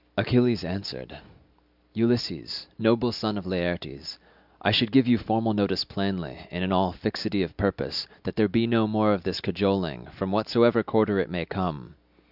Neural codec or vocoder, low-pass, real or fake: none; 5.4 kHz; real